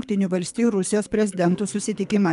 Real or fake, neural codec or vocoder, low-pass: fake; codec, 24 kHz, 3 kbps, HILCodec; 10.8 kHz